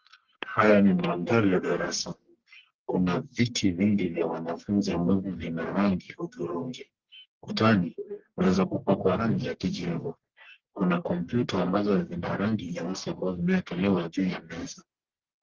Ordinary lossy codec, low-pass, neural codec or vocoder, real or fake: Opus, 16 kbps; 7.2 kHz; codec, 44.1 kHz, 1.7 kbps, Pupu-Codec; fake